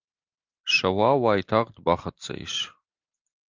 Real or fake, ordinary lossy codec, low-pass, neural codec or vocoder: real; Opus, 24 kbps; 7.2 kHz; none